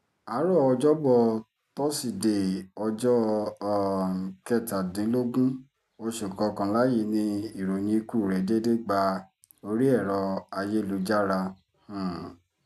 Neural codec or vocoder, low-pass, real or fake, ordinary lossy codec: none; 14.4 kHz; real; none